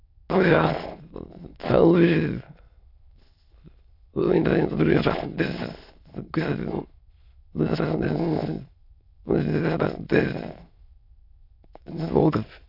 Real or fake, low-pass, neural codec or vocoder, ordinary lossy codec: fake; 5.4 kHz; autoencoder, 22.05 kHz, a latent of 192 numbers a frame, VITS, trained on many speakers; none